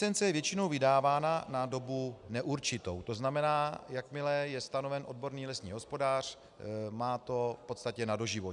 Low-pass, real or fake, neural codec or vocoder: 10.8 kHz; real; none